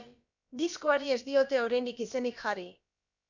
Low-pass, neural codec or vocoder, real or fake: 7.2 kHz; codec, 16 kHz, about 1 kbps, DyCAST, with the encoder's durations; fake